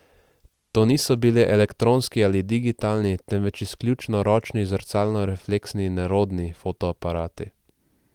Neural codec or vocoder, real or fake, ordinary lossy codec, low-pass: none; real; Opus, 24 kbps; 19.8 kHz